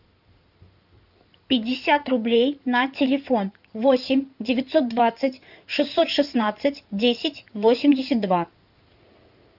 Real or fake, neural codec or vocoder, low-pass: fake; codec, 16 kHz in and 24 kHz out, 2.2 kbps, FireRedTTS-2 codec; 5.4 kHz